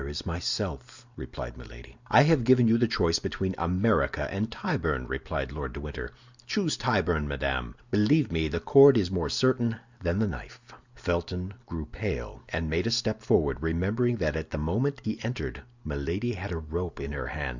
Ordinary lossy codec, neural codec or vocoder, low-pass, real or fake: Opus, 64 kbps; none; 7.2 kHz; real